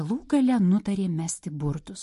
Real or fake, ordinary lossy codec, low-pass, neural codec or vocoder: real; MP3, 48 kbps; 14.4 kHz; none